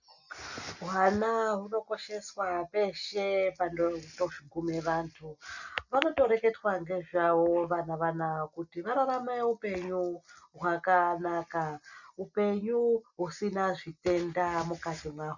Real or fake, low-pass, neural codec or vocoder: real; 7.2 kHz; none